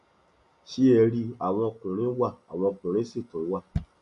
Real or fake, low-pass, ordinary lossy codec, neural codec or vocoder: real; 10.8 kHz; none; none